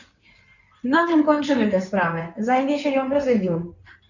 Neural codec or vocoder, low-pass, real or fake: codec, 16 kHz in and 24 kHz out, 2.2 kbps, FireRedTTS-2 codec; 7.2 kHz; fake